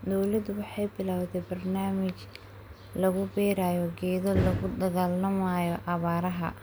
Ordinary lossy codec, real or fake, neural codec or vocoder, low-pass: none; real; none; none